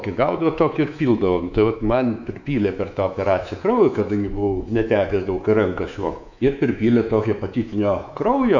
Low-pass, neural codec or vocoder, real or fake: 7.2 kHz; codec, 24 kHz, 3.1 kbps, DualCodec; fake